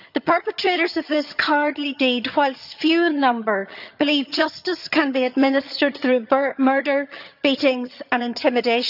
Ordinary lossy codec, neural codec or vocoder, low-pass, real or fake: none; vocoder, 22.05 kHz, 80 mel bands, HiFi-GAN; 5.4 kHz; fake